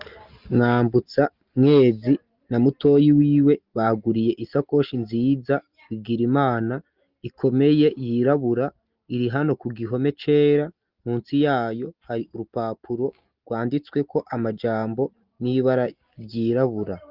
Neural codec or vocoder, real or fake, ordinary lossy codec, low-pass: none; real; Opus, 24 kbps; 5.4 kHz